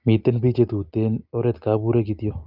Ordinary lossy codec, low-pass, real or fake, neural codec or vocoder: Opus, 24 kbps; 5.4 kHz; real; none